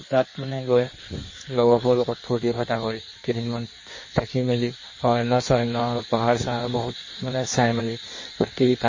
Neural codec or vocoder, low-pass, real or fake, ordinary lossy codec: codec, 16 kHz in and 24 kHz out, 1.1 kbps, FireRedTTS-2 codec; 7.2 kHz; fake; MP3, 32 kbps